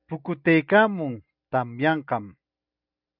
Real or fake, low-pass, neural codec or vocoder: real; 5.4 kHz; none